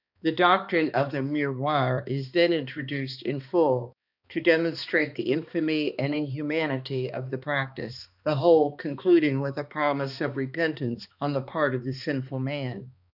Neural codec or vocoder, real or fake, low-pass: codec, 16 kHz, 2 kbps, X-Codec, HuBERT features, trained on balanced general audio; fake; 5.4 kHz